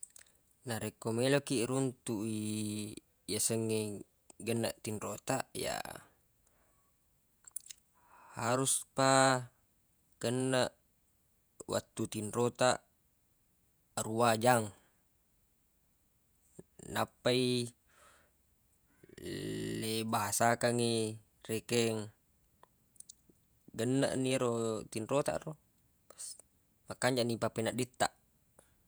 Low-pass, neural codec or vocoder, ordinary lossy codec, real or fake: none; vocoder, 48 kHz, 128 mel bands, Vocos; none; fake